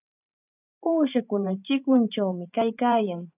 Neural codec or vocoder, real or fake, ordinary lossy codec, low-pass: vocoder, 44.1 kHz, 128 mel bands every 512 samples, BigVGAN v2; fake; AAC, 32 kbps; 3.6 kHz